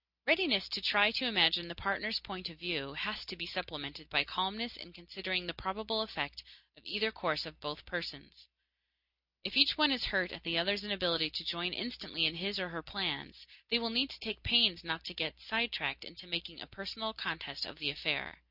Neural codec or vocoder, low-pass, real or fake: none; 5.4 kHz; real